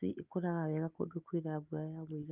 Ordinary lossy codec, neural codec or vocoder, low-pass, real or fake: Opus, 32 kbps; none; 3.6 kHz; real